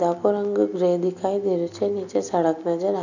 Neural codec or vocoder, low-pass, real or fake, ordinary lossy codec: none; 7.2 kHz; real; none